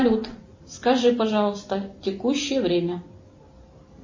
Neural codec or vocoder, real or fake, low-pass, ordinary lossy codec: none; real; 7.2 kHz; MP3, 32 kbps